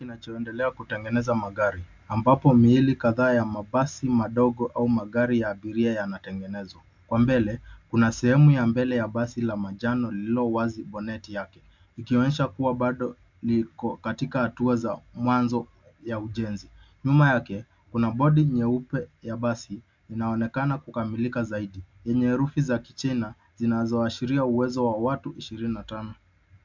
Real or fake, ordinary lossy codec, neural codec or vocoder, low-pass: real; MP3, 64 kbps; none; 7.2 kHz